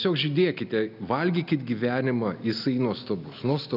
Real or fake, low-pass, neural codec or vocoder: real; 5.4 kHz; none